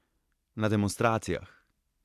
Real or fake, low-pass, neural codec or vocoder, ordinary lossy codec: real; 14.4 kHz; none; AAC, 64 kbps